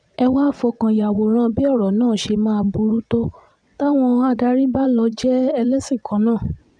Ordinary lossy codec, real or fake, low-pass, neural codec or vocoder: none; fake; 9.9 kHz; vocoder, 22.05 kHz, 80 mel bands, WaveNeXt